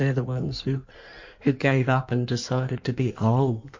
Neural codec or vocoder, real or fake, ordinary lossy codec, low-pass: codec, 16 kHz in and 24 kHz out, 1.1 kbps, FireRedTTS-2 codec; fake; MP3, 48 kbps; 7.2 kHz